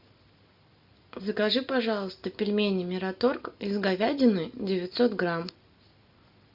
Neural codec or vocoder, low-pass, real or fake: none; 5.4 kHz; real